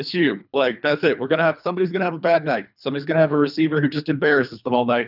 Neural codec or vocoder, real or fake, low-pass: codec, 24 kHz, 3 kbps, HILCodec; fake; 5.4 kHz